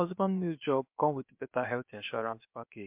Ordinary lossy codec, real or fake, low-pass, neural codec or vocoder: MP3, 32 kbps; fake; 3.6 kHz; codec, 16 kHz, 0.7 kbps, FocalCodec